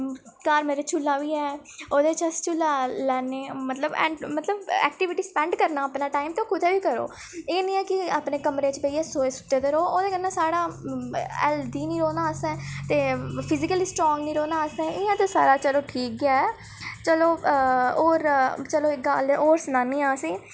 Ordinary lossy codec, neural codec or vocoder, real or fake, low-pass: none; none; real; none